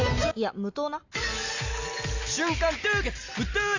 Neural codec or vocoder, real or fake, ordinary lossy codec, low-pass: none; real; AAC, 48 kbps; 7.2 kHz